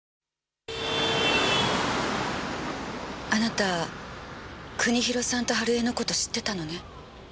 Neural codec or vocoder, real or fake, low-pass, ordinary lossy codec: none; real; none; none